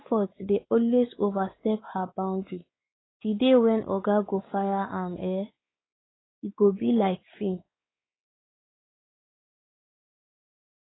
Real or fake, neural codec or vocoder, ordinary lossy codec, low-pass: fake; codec, 16 kHz, 4 kbps, X-Codec, WavLM features, trained on Multilingual LibriSpeech; AAC, 16 kbps; 7.2 kHz